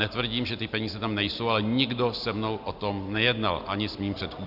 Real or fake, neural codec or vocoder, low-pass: real; none; 5.4 kHz